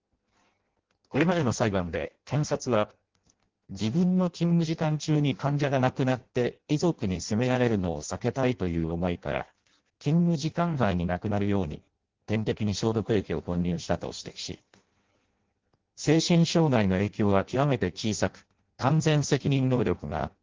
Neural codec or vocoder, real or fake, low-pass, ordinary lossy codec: codec, 16 kHz in and 24 kHz out, 0.6 kbps, FireRedTTS-2 codec; fake; 7.2 kHz; Opus, 16 kbps